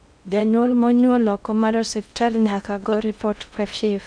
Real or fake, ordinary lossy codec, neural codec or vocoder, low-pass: fake; none; codec, 16 kHz in and 24 kHz out, 0.6 kbps, FocalCodec, streaming, 2048 codes; 9.9 kHz